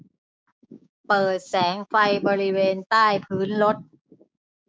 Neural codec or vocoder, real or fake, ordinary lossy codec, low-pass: codec, 16 kHz, 6 kbps, DAC; fake; none; none